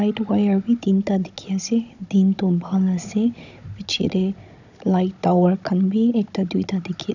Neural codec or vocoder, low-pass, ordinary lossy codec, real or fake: codec, 16 kHz, 16 kbps, FunCodec, trained on Chinese and English, 50 frames a second; 7.2 kHz; none; fake